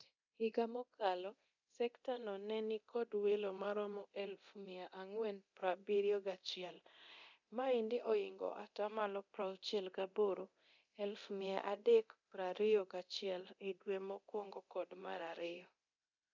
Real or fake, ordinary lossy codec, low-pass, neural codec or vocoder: fake; none; 7.2 kHz; codec, 24 kHz, 0.9 kbps, DualCodec